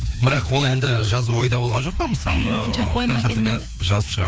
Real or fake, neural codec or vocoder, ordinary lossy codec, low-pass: fake; codec, 16 kHz, 2 kbps, FreqCodec, larger model; none; none